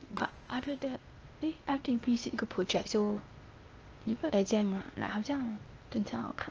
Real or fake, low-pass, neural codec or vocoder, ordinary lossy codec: fake; 7.2 kHz; codec, 16 kHz, 0.8 kbps, ZipCodec; Opus, 24 kbps